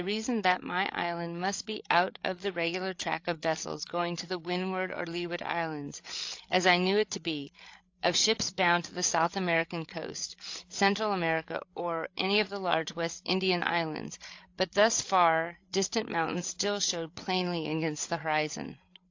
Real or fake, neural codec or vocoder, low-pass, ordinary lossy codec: fake; codec, 16 kHz, 8 kbps, FreqCodec, larger model; 7.2 kHz; AAC, 48 kbps